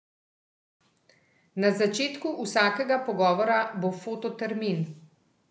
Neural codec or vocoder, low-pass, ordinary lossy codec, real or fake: none; none; none; real